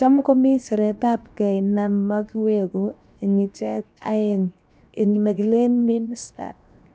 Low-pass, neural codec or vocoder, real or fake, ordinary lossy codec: none; codec, 16 kHz, 0.7 kbps, FocalCodec; fake; none